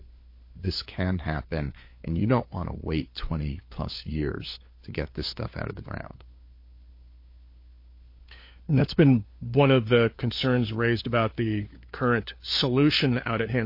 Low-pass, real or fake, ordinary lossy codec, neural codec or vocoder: 5.4 kHz; fake; MP3, 32 kbps; codec, 16 kHz, 4 kbps, FunCodec, trained on LibriTTS, 50 frames a second